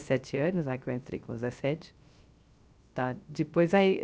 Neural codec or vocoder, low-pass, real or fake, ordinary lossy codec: codec, 16 kHz, 0.3 kbps, FocalCodec; none; fake; none